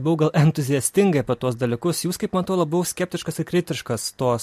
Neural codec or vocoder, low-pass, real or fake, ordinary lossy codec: none; 14.4 kHz; real; MP3, 64 kbps